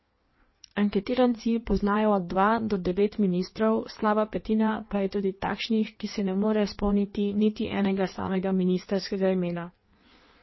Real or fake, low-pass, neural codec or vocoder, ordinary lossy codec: fake; 7.2 kHz; codec, 16 kHz in and 24 kHz out, 1.1 kbps, FireRedTTS-2 codec; MP3, 24 kbps